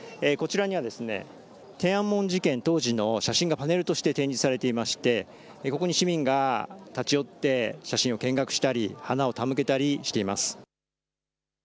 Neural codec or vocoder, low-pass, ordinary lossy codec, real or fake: none; none; none; real